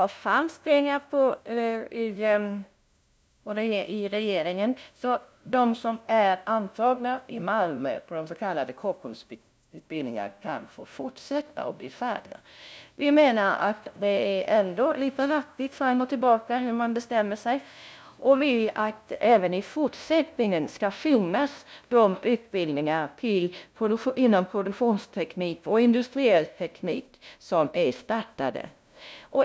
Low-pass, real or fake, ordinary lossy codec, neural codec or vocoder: none; fake; none; codec, 16 kHz, 0.5 kbps, FunCodec, trained on LibriTTS, 25 frames a second